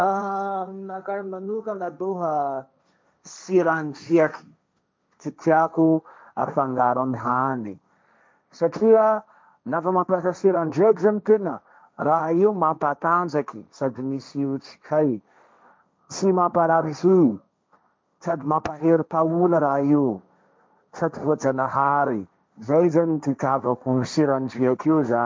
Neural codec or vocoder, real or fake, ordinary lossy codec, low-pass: codec, 16 kHz, 1.1 kbps, Voila-Tokenizer; fake; none; none